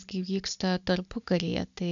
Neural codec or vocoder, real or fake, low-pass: none; real; 7.2 kHz